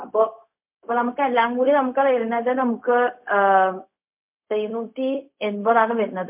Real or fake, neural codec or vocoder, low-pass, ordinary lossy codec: fake; codec, 16 kHz, 0.4 kbps, LongCat-Audio-Codec; 3.6 kHz; MP3, 32 kbps